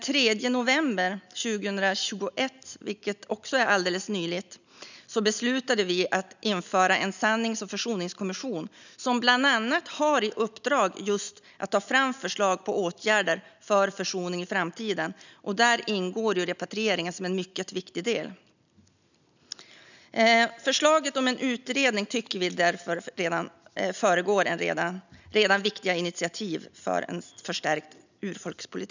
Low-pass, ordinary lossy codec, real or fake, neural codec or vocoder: 7.2 kHz; none; real; none